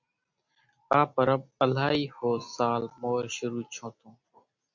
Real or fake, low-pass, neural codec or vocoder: real; 7.2 kHz; none